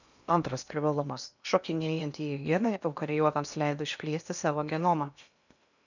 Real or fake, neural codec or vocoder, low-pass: fake; codec, 16 kHz in and 24 kHz out, 0.8 kbps, FocalCodec, streaming, 65536 codes; 7.2 kHz